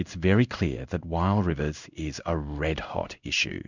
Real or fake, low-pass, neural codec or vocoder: fake; 7.2 kHz; codec, 16 kHz in and 24 kHz out, 1 kbps, XY-Tokenizer